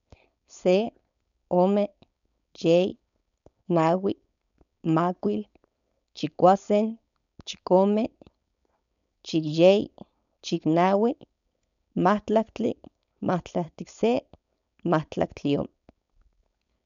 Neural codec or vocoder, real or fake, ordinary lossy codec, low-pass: codec, 16 kHz, 4.8 kbps, FACodec; fake; none; 7.2 kHz